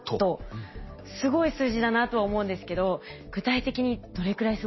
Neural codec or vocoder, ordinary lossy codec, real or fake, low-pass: none; MP3, 24 kbps; real; 7.2 kHz